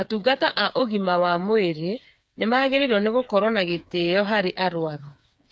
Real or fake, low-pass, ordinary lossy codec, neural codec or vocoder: fake; none; none; codec, 16 kHz, 4 kbps, FreqCodec, smaller model